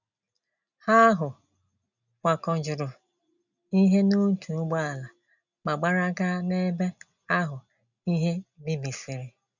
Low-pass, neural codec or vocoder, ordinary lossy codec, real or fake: 7.2 kHz; none; none; real